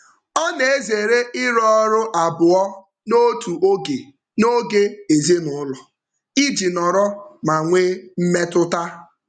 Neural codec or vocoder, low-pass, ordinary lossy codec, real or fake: none; 9.9 kHz; none; real